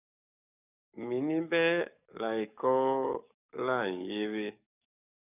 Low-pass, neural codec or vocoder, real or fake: 3.6 kHz; codec, 44.1 kHz, 7.8 kbps, DAC; fake